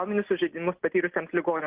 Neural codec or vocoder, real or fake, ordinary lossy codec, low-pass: none; real; Opus, 16 kbps; 3.6 kHz